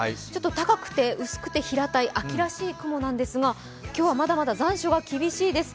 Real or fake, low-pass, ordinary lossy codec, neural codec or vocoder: real; none; none; none